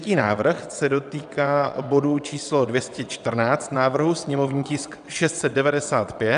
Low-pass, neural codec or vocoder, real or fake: 9.9 kHz; vocoder, 22.05 kHz, 80 mel bands, WaveNeXt; fake